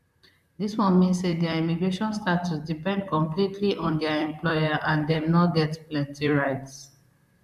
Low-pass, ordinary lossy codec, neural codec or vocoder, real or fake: 14.4 kHz; none; vocoder, 44.1 kHz, 128 mel bands, Pupu-Vocoder; fake